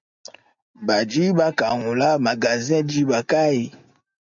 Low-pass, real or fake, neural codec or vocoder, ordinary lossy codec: 7.2 kHz; real; none; MP3, 64 kbps